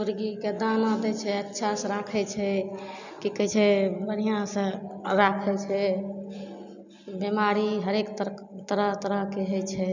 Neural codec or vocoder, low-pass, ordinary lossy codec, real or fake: none; 7.2 kHz; none; real